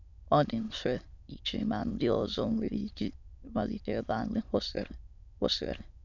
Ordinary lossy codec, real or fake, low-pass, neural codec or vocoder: none; fake; 7.2 kHz; autoencoder, 22.05 kHz, a latent of 192 numbers a frame, VITS, trained on many speakers